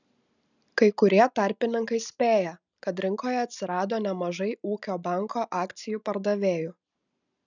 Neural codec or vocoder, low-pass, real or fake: none; 7.2 kHz; real